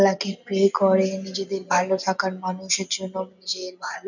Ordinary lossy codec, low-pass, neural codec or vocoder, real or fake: none; 7.2 kHz; none; real